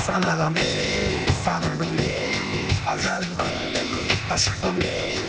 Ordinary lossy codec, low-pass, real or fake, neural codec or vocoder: none; none; fake; codec, 16 kHz, 0.8 kbps, ZipCodec